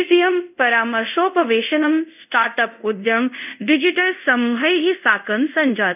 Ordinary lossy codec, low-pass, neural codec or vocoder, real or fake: none; 3.6 kHz; codec, 24 kHz, 0.5 kbps, DualCodec; fake